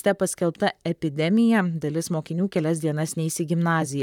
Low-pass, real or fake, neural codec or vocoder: 19.8 kHz; fake; vocoder, 44.1 kHz, 128 mel bands, Pupu-Vocoder